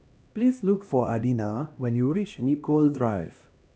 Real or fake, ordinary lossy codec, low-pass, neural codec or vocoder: fake; none; none; codec, 16 kHz, 1 kbps, X-Codec, HuBERT features, trained on LibriSpeech